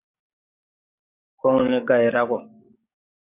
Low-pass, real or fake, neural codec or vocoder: 3.6 kHz; fake; codec, 44.1 kHz, 7.8 kbps, DAC